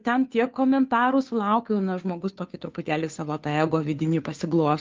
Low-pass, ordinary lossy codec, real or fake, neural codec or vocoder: 7.2 kHz; Opus, 24 kbps; fake; codec, 16 kHz, 2 kbps, FunCodec, trained on Chinese and English, 25 frames a second